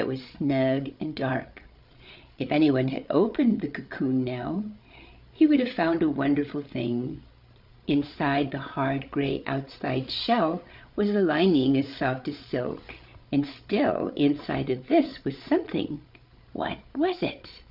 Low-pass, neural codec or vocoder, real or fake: 5.4 kHz; codec, 16 kHz, 8 kbps, FreqCodec, larger model; fake